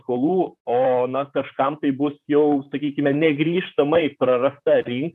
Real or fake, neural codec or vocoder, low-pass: fake; vocoder, 44.1 kHz, 128 mel bands, Pupu-Vocoder; 14.4 kHz